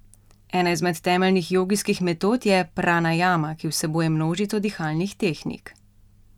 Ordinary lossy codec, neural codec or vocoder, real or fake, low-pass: none; none; real; 19.8 kHz